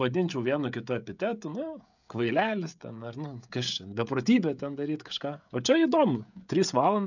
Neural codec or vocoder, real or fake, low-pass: codec, 16 kHz, 16 kbps, FreqCodec, smaller model; fake; 7.2 kHz